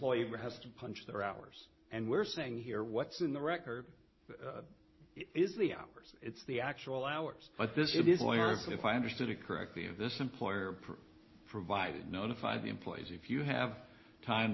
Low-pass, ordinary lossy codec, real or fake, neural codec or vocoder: 7.2 kHz; MP3, 24 kbps; real; none